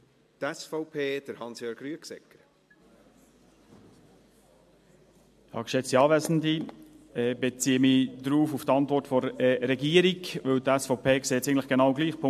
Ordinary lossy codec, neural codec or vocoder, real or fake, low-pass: MP3, 64 kbps; none; real; 14.4 kHz